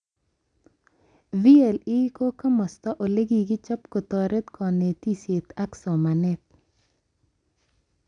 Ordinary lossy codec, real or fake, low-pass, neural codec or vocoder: none; real; 9.9 kHz; none